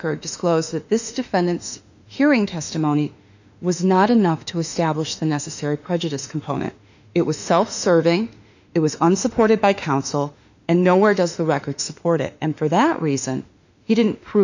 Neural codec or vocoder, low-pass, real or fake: autoencoder, 48 kHz, 32 numbers a frame, DAC-VAE, trained on Japanese speech; 7.2 kHz; fake